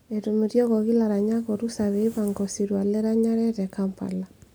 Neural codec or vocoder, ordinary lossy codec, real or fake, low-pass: none; none; real; none